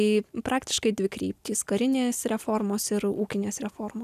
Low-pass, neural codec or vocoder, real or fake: 14.4 kHz; none; real